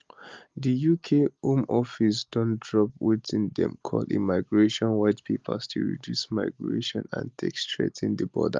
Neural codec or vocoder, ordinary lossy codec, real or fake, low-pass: none; Opus, 32 kbps; real; 7.2 kHz